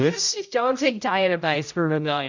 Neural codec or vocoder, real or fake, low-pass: codec, 16 kHz, 0.5 kbps, X-Codec, HuBERT features, trained on general audio; fake; 7.2 kHz